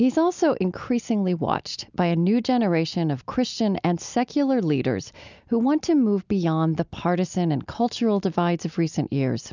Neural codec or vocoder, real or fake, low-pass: none; real; 7.2 kHz